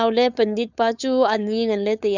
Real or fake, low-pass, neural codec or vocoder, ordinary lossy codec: fake; 7.2 kHz; codec, 16 kHz, 4.8 kbps, FACodec; none